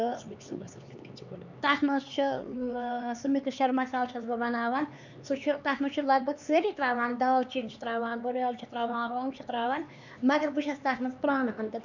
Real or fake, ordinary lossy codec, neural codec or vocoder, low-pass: fake; none; codec, 16 kHz, 4 kbps, X-Codec, HuBERT features, trained on LibriSpeech; 7.2 kHz